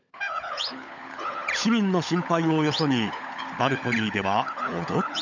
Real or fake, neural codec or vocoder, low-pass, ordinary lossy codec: fake; codec, 16 kHz, 16 kbps, FunCodec, trained on LibriTTS, 50 frames a second; 7.2 kHz; none